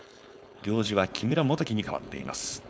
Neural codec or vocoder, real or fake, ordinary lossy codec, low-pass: codec, 16 kHz, 4.8 kbps, FACodec; fake; none; none